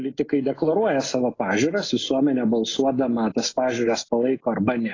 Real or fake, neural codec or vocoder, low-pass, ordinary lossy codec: real; none; 7.2 kHz; AAC, 32 kbps